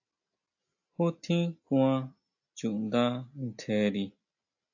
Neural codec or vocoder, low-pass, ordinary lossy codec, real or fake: none; 7.2 kHz; AAC, 48 kbps; real